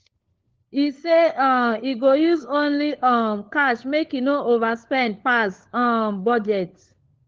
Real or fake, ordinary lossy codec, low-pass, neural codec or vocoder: fake; Opus, 16 kbps; 7.2 kHz; codec, 16 kHz, 4 kbps, FunCodec, trained on LibriTTS, 50 frames a second